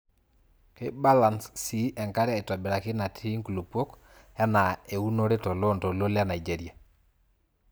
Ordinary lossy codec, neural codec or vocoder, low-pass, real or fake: none; none; none; real